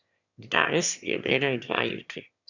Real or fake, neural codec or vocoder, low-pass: fake; autoencoder, 22.05 kHz, a latent of 192 numbers a frame, VITS, trained on one speaker; 7.2 kHz